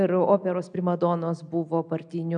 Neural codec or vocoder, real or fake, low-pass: none; real; 9.9 kHz